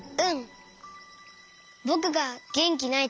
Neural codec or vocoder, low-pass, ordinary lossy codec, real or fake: none; none; none; real